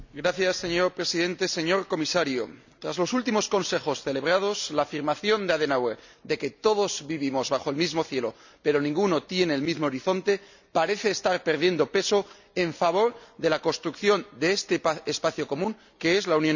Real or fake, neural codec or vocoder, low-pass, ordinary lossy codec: real; none; 7.2 kHz; none